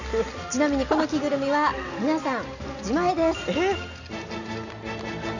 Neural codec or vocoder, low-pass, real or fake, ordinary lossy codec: none; 7.2 kHz; real; none